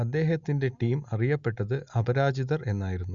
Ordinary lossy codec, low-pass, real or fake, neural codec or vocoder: Opus, 64 kbps; 7.2 kHz; real; none